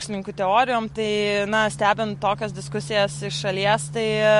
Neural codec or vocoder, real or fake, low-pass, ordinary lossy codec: none; real; 14.4 kHz; MP3, 48 kbps